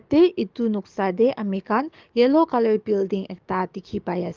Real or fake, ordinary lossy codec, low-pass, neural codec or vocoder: fake; Opus, 24 kbps; 7.2 kHz; codec, 44.1 kHz, 7.8 kbps, DAC